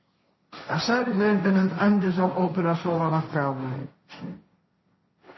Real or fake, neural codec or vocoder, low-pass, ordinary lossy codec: fake; codec, 16 kHz, 1.1 kbps, Voila-Tokenizer; 7.2 kHz; MP3, 24 kbps